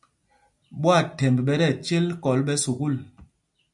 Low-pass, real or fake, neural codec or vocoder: 10.8 kHz; real; none